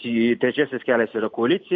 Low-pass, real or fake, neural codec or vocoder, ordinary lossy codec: 7.2 kHz; real; none; MP3, 48 kbps